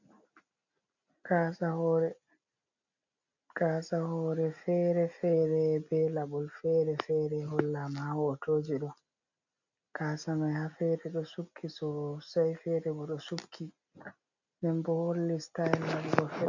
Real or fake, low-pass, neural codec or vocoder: real; 7.2 kHz; none